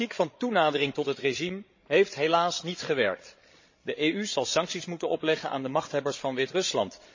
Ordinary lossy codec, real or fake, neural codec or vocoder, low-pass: MP3, 32 kbps; fake; codec, 16 kHz, 16 kbps, FreqCodec, larger model; 7.2 kHz